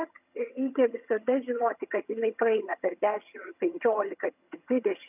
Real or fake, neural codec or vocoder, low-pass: fake; vocoder, 22.05 kHz, 80 mel bands, HiFi-GAN; 3.6 kHz